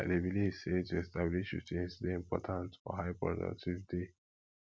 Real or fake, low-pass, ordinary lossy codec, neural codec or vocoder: real; none; none; none